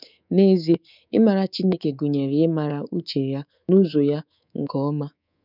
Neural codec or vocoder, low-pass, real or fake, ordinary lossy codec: codec, 24 kHz, 3.1 kbps, DualCodec; 5.4 kHz; fake; none